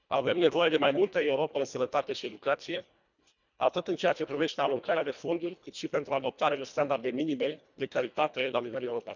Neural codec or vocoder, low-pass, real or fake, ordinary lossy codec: codec, 24 kHz, 1.5 kbps, HILCodec; 7.2 kHz; fake; none